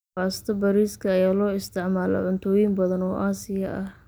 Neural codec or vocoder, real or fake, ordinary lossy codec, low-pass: none; real; none; none